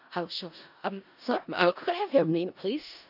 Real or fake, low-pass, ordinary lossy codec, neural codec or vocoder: fake; 5.4 kHz; none; codec, 16 kHz in and 24 kHz out, 0.4 kbps, LongCat-Audio-Codec, four codebook decoder